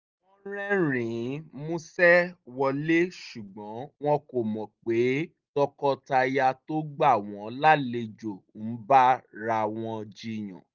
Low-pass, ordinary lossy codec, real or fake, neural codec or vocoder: 7.2 kHz; Opus, 32 kbps; real; none